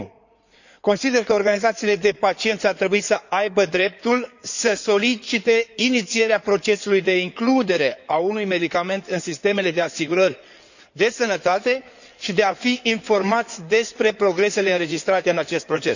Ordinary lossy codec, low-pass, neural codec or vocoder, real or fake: none; 7.2 kHz; codec, 16 kHz in and 24 kHz out, 2.2 kbps, FireRedTTS-2 codec; fake